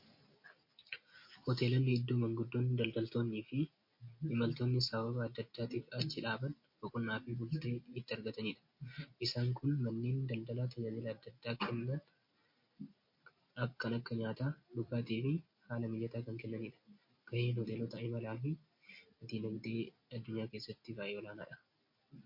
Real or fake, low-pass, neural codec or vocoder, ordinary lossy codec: real; 5.4 kHz; none; MP3, 32 kbps